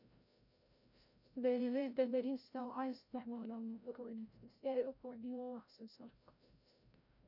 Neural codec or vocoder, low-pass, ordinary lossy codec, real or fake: codec, 16 kHz, 0.5 kbps, FreqCodec, larger model; 5.4 kHz; none; fake